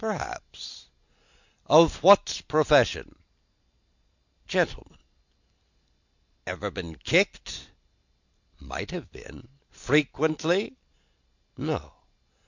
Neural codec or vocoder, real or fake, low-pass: none; real; 7.2 kHz